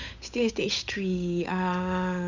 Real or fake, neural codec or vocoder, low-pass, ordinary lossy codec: fake; codec, 16 kHz, 8 kbps, FunCodec, trained on Chinese and English, 25 frames a second; 7.2 kHz; none